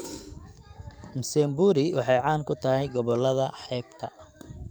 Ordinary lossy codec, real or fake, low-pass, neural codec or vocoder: none; fake; none; codec, 44.1 kHz, 7.8 kbps, DAC